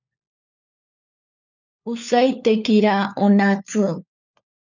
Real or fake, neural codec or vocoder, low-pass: fake; codec, 16 kHz, 4 kbps, FunCodec, trained on LibriTTS, 50 frames a second; 7.2 kHz